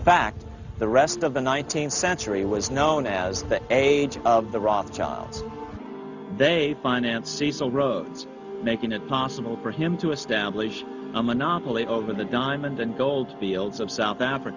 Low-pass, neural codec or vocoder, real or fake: 7.2 kHz; none; real